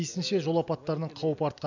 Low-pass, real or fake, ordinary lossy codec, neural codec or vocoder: 7.2 kHz; real; none; none